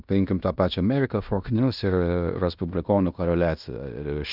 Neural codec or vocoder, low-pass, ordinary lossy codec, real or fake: codec, 16 kHz in and 24 kHz out, 0.9 kbps, LongCat-Audio-Codec, fine tuned four codebook decoder; 5.4 kHz; Opus, 64 kbps; fake